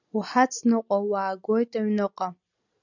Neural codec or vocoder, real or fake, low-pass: none; real; 7.2 kHz